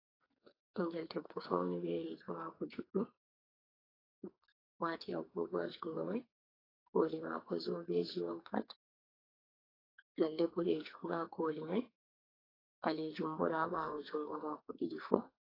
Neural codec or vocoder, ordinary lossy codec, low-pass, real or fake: codec, 44.1 kHz, 2.6 kbps, SNAC; AAC, 24 kbps; 5.4 kHz; fake